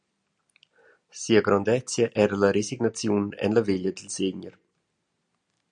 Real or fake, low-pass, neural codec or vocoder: real; 9.9 kHz; none